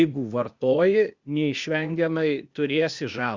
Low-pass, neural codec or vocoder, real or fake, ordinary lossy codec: 7.2 kHz; codec, 16 kHz, 0.8 kbps, ZipCodec; fake; Opus, 64 kbps